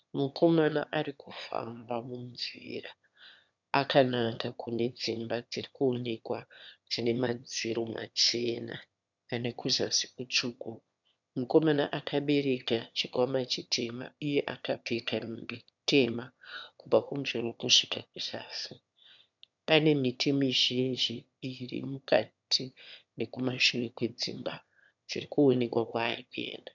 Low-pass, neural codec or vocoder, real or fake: 7.2 kHz; autoencoder, 22.05 kHz, a latent of 192 numbers a frame, VITS, trained on one speaker; fake